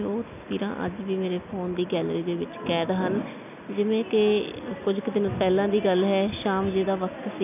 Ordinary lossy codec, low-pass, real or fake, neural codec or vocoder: none; 3.6 kHz; real; none